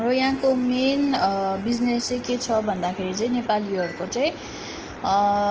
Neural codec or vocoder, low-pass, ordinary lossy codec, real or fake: none; 7.2 kHz; Opus, 16 kbps; real